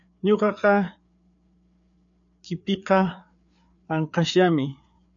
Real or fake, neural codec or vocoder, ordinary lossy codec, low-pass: fake; codec, 16 kHz, 4 kbps, FreqCodec, larger model; MP3, 96 kbps; 7.2 kHz